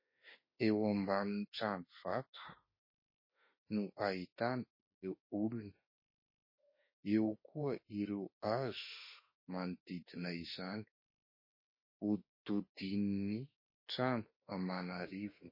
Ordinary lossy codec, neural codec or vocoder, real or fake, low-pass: MP3, 24 kbps; autoencoder, 48 kHz, 32 numbers a frame, DAC-VAE, trained on Japanese speech; fake; 5.4 kHz